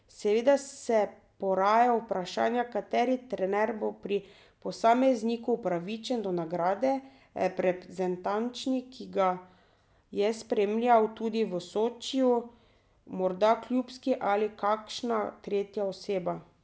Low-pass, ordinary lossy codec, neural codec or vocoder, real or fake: none; none; none; real